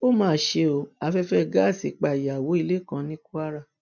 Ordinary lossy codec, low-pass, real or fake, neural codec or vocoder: none; 7.2 kHz; real; none